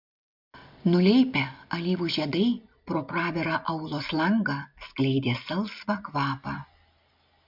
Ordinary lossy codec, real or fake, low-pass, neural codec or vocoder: MP3, 48 kbps; real; 5.4 kHz; none